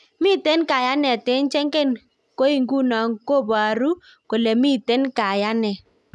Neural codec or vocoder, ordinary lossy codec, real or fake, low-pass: none; none; real; none